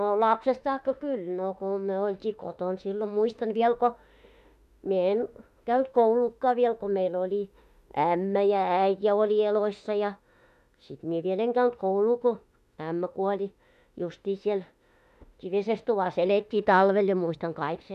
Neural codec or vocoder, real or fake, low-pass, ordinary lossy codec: autoencoder, 48 kHz, 32 numbers a frame, DAC-VAE, trained on Japanese speech; fake; 14.4 kHz; none